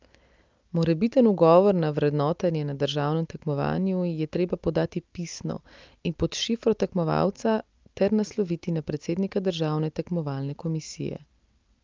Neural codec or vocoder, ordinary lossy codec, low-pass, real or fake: none; Opus, 24 kbps; 7.2 kHz; real